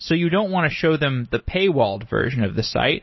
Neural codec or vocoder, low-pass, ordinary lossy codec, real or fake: none; 7.2 kHz; MP3, 24 kbps; real